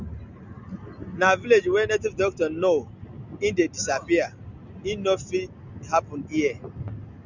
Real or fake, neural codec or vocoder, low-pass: real; none; 7.2 kHz